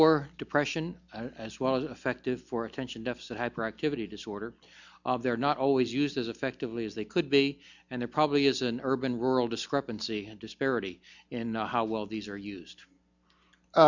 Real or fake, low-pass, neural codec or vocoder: real; 7.2 kHz; none